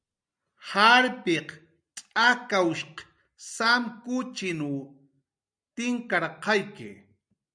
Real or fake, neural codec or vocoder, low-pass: real; none; 9.9 kHz